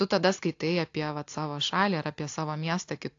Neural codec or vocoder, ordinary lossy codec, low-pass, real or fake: none; AAC, 48 kbps; 7.2 kHz; real